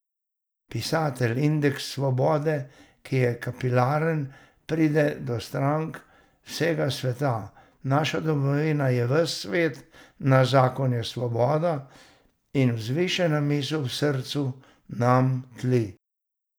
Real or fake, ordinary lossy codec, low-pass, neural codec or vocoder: real; none; none; none